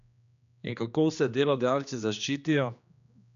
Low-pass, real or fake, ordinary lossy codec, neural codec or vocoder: 7.2 kHz; fake; none; codec, 16 kHz, 2 kbps, X-Codec, HuBERT features, trained on general audio